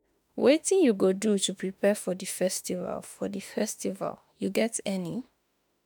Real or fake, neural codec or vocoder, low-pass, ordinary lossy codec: fake; autoencoder, 48 kHz, 32 numbers a frame, DAC-VAE, trained on Japanese speech; none; none